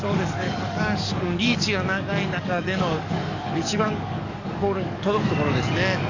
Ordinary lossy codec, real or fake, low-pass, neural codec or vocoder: none; fake; 7.2 kHz; codec, 44.1 kHz, 7.8 kbps, DAC